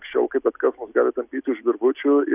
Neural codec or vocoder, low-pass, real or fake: none; 3.6 kHz; real